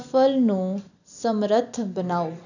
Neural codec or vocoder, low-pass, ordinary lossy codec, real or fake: none; 7.2 kHz; none; real